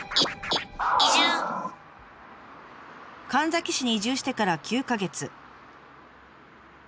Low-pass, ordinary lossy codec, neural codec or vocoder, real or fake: none; none; none; real